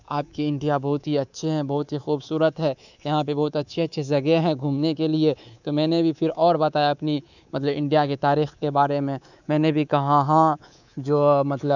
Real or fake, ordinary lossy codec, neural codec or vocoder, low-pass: fake; none; codec, 24 kHz, 3.1 kbps, DualCodec; 7.2 kHz